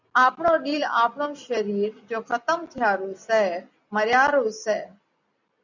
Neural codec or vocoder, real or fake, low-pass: none; real; 7.2 kHz